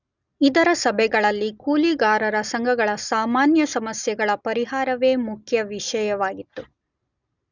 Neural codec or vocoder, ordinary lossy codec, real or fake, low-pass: none; none; real; 7.2 kHz